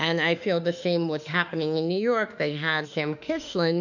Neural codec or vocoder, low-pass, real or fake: autoencoder, 48 kHz, 32 numbers a frame, DAC-VAE, trained on Japanese speech; 7.2 kHz; fake